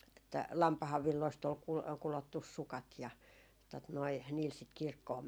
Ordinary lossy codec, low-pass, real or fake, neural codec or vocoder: none; none; real; none